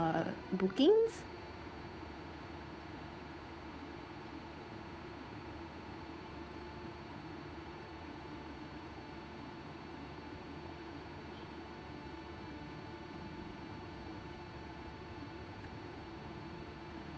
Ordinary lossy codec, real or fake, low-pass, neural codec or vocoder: none; fake; none; codec, 16 kHz, 8 kbps, FunCodec, trained on Chinese and English, 25 frames a second